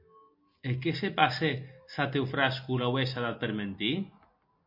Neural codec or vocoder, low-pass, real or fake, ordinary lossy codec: none; 5.4 kHz; real; MP3, 32 kbps